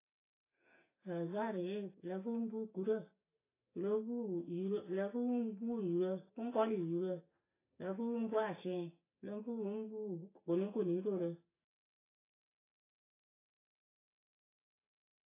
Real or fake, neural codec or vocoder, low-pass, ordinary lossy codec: real; none; 3.6 kHz; AAC, 16 kbps